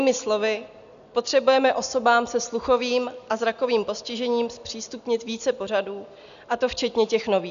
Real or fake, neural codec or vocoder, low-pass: real; none; 7.2 kHz